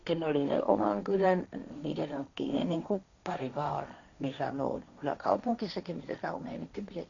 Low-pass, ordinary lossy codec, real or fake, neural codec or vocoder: 7.2 kHz; none; fake; codec, 16 kHz, 1.1 kbps, Voila-Tokenizer